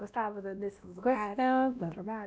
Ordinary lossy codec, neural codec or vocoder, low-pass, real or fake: none; codec, 16 kHz, 1 kbps, X-Codec, WavLM features, trained on Multilingual LibriSpeech; none; fake